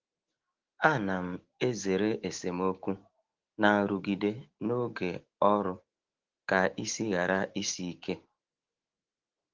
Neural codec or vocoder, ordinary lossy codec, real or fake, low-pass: autoencoder, 48 kHz, 128 numbers a frame, DAC-VAE, trained on Japanese speech; Opus, 16 kbps; fake; 7.2 kHz